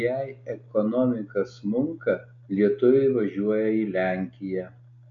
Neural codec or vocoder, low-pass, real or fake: none; 7.2 kHz; real